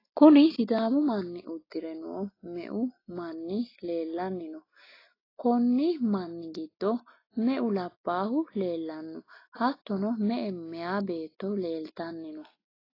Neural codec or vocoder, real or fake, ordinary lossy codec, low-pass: none; real; AAC, 24 kbps; 5.4 kHz